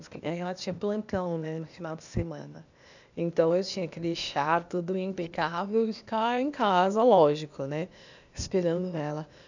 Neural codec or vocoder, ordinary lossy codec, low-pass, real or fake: codec, 16 kHz, 0.8 kbps, ZipCodec; none; 7.2 kHz; fake